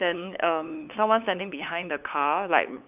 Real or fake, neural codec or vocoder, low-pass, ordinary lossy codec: fake; codec, 16 kHz, 8 kbps, FunCodec, trained on LibriTTS, 25 frames a second; 3.6 kHz; none